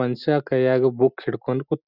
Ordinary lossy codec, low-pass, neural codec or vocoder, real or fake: none; 5.4 kHz; none; real